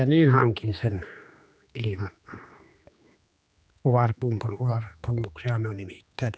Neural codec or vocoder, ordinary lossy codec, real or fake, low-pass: codec, 16 kHz, 2 kbps, X-Codec, HuBERT features, trained on general audio; none; fake; none